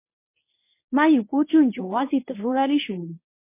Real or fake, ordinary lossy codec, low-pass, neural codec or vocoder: fake; MP3, 24 kbps; 3.6 kHz; codec, 24 kHz, 0.9 kbps, WavTokenizer, medium speech release version 1